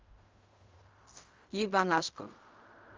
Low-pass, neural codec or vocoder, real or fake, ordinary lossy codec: 7.2 kHz; codec, 16 kHz in and 24 kHz out, 0.4 kbps, LongCat-Audio-Codec, fine tuned four codebook decoder; fake; Opus, 32 kbps